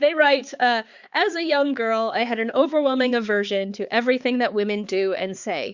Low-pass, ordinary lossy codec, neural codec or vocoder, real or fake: 7.2 kHz; Opus, 64 kbps; codec, 16 kHz, 4 kbps, X-Codec, HuBERT features, trained on balanced general audio; fake